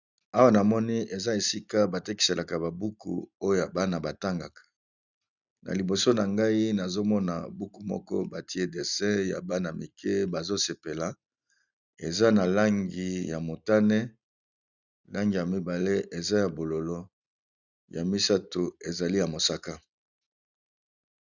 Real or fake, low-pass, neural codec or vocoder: real; 7.2 kHz; none